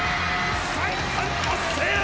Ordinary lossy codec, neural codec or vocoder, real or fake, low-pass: none; none; real; none